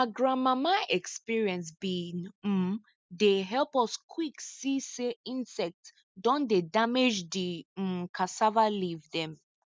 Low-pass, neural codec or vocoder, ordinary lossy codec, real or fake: none; none; none; real